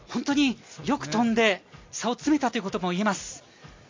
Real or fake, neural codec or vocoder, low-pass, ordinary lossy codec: real; none; 7.2 kHz; MP3, 48 kbps